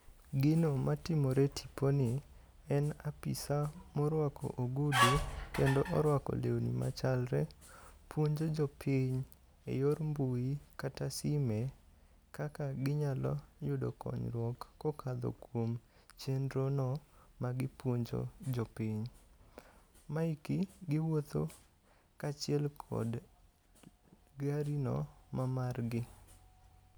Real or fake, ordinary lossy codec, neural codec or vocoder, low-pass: real; none; none; none